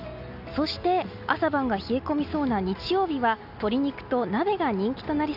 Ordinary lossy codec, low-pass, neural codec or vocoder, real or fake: none; 5.4 kHz; none; real